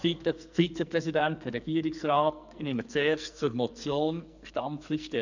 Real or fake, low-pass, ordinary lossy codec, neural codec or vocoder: fake; 7.2 kHz; none; codec, 44.1 kHz, 2.6 kbps, SNAC